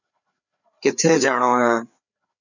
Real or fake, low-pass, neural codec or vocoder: fake; 7.2 kHz; codec, 16 kHz, 4 kbps, FreqCodec, larger model